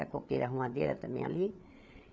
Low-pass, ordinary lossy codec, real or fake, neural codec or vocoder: none; none; fake; codec, 16 kHz, 8 kbps, FreqCodec, larger model